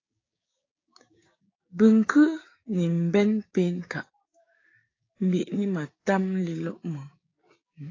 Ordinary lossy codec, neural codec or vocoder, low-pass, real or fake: AAC, 32 kbps; codec, 16 kHz, 6 kbps, DAC; 7.2 kHz; fake